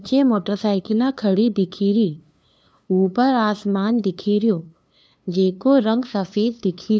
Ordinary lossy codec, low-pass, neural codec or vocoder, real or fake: none; none; codec, 16 kHz, 2 kbps, FunCodec, trained on LibriTTS, 25 frames a second; fake